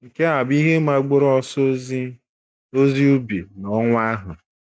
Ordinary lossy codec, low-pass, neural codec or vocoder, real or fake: none; none; none; real